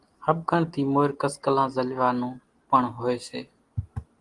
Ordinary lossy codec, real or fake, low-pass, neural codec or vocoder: Opus, 24 kbps; real; 10.8 kHz; none